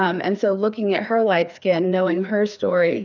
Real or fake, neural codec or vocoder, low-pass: fake; codec, 16 kHz, 2 kbps, FreqCodec, larger model; 7.2 kHz